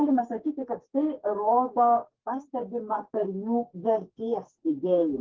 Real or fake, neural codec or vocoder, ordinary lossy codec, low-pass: fake; codec, 44.1 kHz, 2.6 kbps, SNAC; Opus, 16 kbps; 7.2 kHz